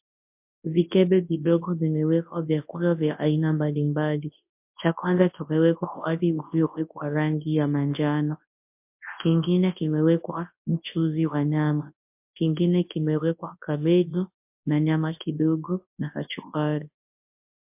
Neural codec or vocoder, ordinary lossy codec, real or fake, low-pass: codec, 24 kHz, 0.9 kbps, WavTokenizer, large speech release; MP3, 32 kbps; fake; 3.6 kHz